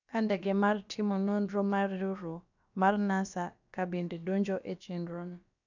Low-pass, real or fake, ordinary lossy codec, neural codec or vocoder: 7.2 kHz; fake; none; codec, 16 kHz, about 1 kbps, DyCAST, with the encoder's durations